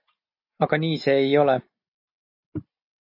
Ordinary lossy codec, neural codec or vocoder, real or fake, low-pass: MP3, 32 kbps; none; real; 5.4 kHz